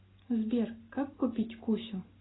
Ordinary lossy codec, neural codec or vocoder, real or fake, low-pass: AAC, 16 kbps; none; real; 7.2 kHz